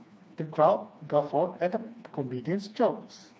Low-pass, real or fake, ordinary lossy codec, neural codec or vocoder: none; fake; none; codec, 16 kHz, 2 kbps, FreqCodec, smaller model